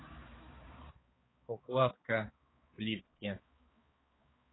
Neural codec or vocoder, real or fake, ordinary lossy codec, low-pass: codec, 16 kHz, 4 kbps, X-Codec, HuBERT features, trained on balanced general audio; fake; AAC, 16 kbps; 7.2 kHz